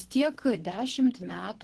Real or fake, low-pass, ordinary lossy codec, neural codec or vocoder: fake; 10.8 kHz; Opus, 16 kbps; codec, 24 kHz, 3 kbps, HILCodec